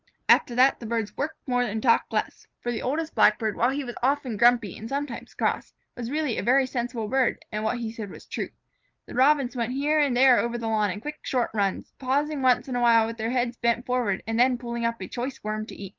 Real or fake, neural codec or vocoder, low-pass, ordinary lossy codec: real; none; 7.2 kHz; Opus, 24 kbps